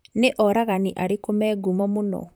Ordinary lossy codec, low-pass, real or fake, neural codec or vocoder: none; none; fake; vocoder, 44.1 kHz, 128 mel bands, Pupu-Vocoder